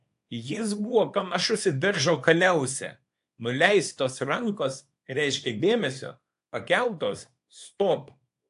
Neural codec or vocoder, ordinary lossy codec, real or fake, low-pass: codec, 24 kHz, 0.9 kbps, WavTokenizer, small release; AAC, 64 kbps; fake; 10.8 kHz